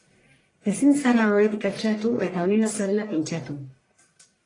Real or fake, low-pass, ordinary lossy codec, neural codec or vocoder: fake; 10.8 kHz; AAC, 32 kbps; codec, 44.1 kHz, 1.7 kbps, Pupu-Codec